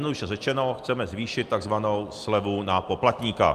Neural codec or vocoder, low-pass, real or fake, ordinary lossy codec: none; 14.4 kHz; real; Opus, 32 kbps